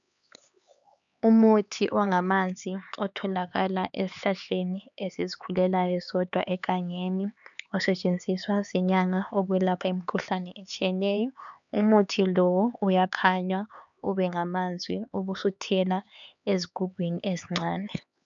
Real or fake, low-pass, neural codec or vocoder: fake; 7.2 kHz; codec, 16 kHz, 4 kbps, X-Codec, HuBERT features, trained on LibriSpeech